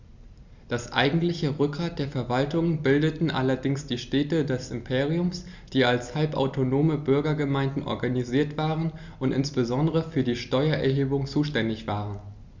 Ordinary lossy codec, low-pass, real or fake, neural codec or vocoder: Opus, 64 kbps; 7.2 kHz; real; none